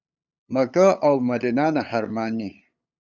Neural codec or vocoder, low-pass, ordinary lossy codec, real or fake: codec, 16 kHz, 8 kbps, FunCodec, trained on LibriTTS, 25 frames a second; 7.2 kHz; Opus, 64 kbps; fake